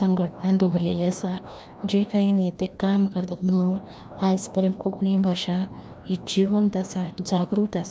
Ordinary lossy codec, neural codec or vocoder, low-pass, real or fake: none; codec, 16 kHz, 1 kbps, FreqCodec, larger model; none; fake